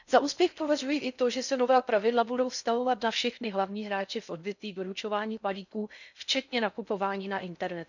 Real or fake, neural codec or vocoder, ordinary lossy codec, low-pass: fake; codec, 16 kHz in and 24 kHz out, 0.6 kbps, FocalCodec, streaming, 4096 codes; none; 7.2 kHz